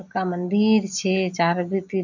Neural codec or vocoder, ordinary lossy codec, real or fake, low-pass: none; none; real; 7.2 kHz